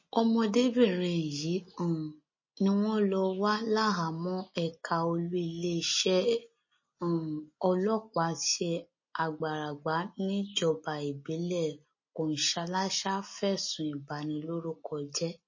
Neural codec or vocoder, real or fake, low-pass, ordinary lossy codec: none; real; 7.2 kHz; MP3, 32 kbps